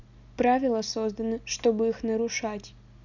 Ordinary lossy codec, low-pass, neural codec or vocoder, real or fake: none; 7.2 kHz; none; real